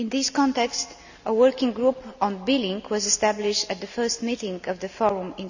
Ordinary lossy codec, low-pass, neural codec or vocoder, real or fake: none; 7.2 kHz; none; real